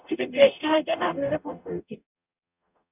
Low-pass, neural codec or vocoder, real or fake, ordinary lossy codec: 3.6 kHz; codec, 44.1 kHz, 0.9 kbps, DAC; fake; none